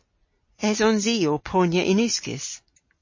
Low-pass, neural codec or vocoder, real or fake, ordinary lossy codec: 7.2 kHz; none; real; MP3, 32 kbps